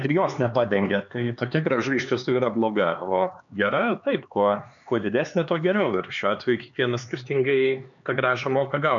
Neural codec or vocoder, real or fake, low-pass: codec, 16 kHz, 4 kbps, X-Codec, HuBERT features, trained on LibriSpeech; fake; 7.2 kHz